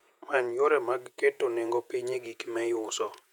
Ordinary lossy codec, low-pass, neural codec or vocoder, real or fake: none; 19.8 kHz; vocoder, 48 kHz, 128 mel bands, Vocos; fake